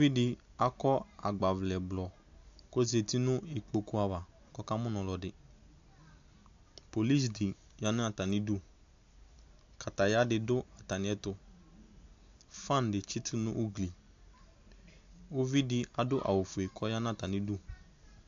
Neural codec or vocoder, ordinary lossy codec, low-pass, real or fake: none; AAC, 64 kbps; 7.2 kHz; real